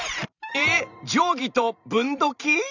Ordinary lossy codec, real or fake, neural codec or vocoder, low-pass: none; fake; vocoder, 44.1 kHz, 128 mel bands every 512 samples, BigVGAN v2; 7.2 kHz